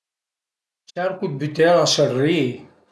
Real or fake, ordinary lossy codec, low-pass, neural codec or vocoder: real; none; none; none